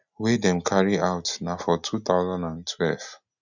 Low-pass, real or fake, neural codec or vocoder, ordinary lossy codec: 7.2 kHz; real; none; none